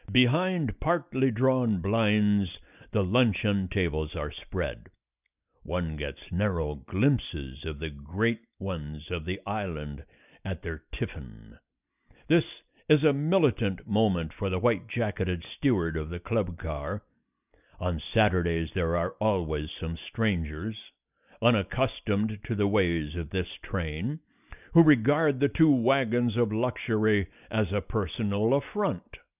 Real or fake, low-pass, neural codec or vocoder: real; 3.6 kHz; none